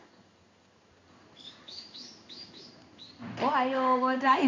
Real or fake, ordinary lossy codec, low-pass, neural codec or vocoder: fake; MP3, 64 kbps; 7.2 kHz; codec, 16 kHz in and 24 kHz out, 1 kbps, XY-Tokenizer